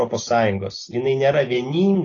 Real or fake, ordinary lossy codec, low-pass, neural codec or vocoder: real; AAC, 32 kbps; 7.2 kHz; none